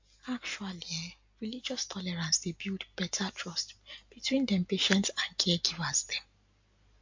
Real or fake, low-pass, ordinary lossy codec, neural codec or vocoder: real; 7.2 kHz; MP3, 48 kbps; none